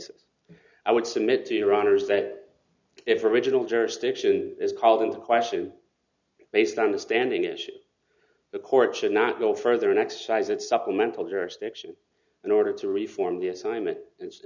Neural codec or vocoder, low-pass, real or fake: none; 7.2 kHz; real